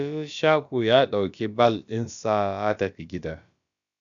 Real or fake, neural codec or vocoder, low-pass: fake; codec, 16 kHz, about 1 kbps, DyCAST, with the encoder's durations; 7.2 kHz